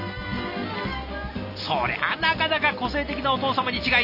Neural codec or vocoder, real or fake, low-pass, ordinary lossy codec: none; real; 5.4 kHz; AAC, 48 kbps